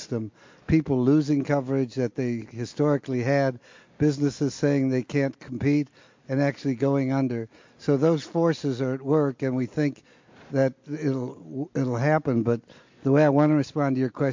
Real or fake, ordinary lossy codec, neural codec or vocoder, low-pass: real; MP3, 48 kbps; none; 7.2 kHz